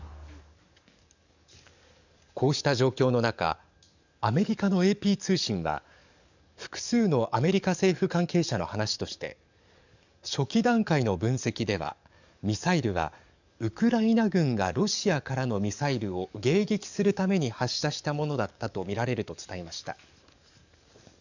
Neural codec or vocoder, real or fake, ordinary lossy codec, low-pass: codec, 44.1 kHz, 7.8 kbps, DAC; fake; none; 7.2 kHz